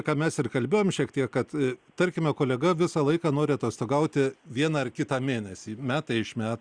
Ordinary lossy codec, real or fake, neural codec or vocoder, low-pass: Opus, 64 kbps; real; none; 9.9 kHz